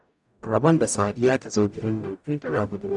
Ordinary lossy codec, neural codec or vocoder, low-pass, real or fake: none; codec, 44.1 kHz, 0.9 kbps, DAC; 10.8 kHz; fake